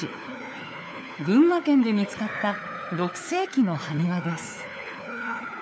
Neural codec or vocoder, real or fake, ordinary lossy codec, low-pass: codec, 16 kHz, 4 kbps, FunCodec, trained on LibriTTS, 50 frames a second; fake; none; none